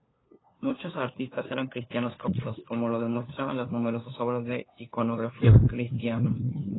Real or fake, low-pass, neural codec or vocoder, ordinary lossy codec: fake; 7.2 kHz; codec, 16 kHz, 2 kbps, FunCodec, trained on LibriTTS, 25 frames a second; AAC, 16 kbps